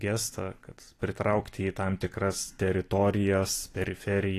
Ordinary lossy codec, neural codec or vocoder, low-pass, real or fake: AAC, 48 kbps; vocoder, 44.1 kHz, 128 mel bands every 256 samples, BigVGAN v2; 14.4 kHz; fake